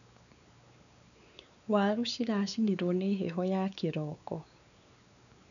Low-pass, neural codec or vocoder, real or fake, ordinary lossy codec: 7.2 kHz; codec, 16 kHz, 4 kbps, X-Codec, WavLM features, trained on Multilingual LibriSpeech; fake; none